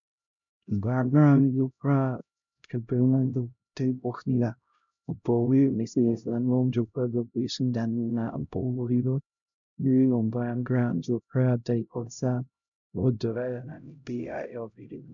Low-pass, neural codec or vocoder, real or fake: 7.2 kHz; codec, 16 kHz, 0.5 kbps, X-Codec, HuBERT features, trained on LibriSpeech; fake